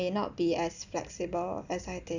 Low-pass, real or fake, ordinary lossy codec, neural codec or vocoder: 7.2 kHz; real; none; none